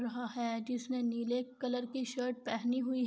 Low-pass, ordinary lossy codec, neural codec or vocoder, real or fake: none; none; none; real